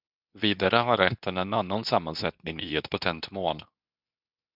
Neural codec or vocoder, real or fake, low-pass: codec, 24 kHz, 0.9 kbps, WavTokenizer, medium speech release version 2; fake; 5.4 kHz